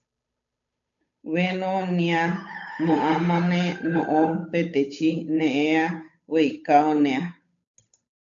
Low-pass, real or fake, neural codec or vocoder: 7.2 kHz; fake; codec, 16 kHz, 8 kbps, FunCodec, trained on Chinese and English, 25 frames a second